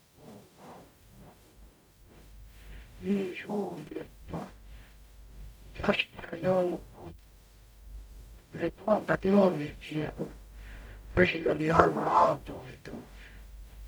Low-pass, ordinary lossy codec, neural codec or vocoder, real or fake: none; none; codec, 44.1 kHz, 0.9 kbps, DAC; fake